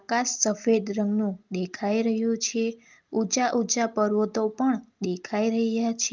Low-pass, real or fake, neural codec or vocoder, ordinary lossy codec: 7.2 kHz; real; none; Opus, 32 kbps